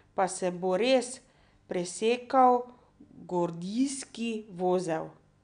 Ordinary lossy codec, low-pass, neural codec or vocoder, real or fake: none; 9.9 kHz; none; real